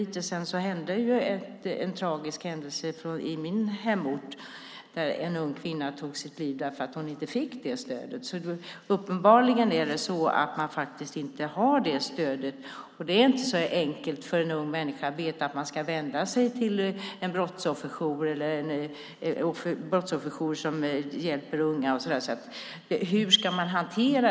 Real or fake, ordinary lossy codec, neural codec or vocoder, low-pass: real; none; none; none